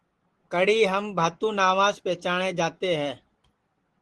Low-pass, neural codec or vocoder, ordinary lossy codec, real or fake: 10.8 kHz; none; Opus, 16 kbps; real